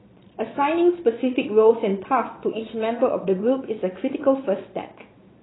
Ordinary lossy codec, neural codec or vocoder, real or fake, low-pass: AAC, 16 kbps; vocoder, 22.05 kHz, 80 mel bands, Vocos; fake; 7.2 kHz